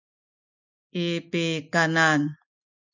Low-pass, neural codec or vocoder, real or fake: 7.2 kHz; none; real